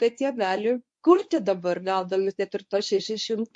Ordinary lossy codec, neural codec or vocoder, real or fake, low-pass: MP3, 48 kbps; codec, 24 kHz, 0.9 kbps, WavTokenizer, medium speech release version 1; fake; 10.8 kHz